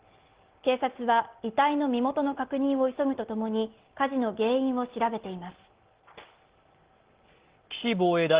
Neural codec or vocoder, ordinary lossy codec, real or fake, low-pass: none; Opus, 16 kbps; real; 3.6 kHz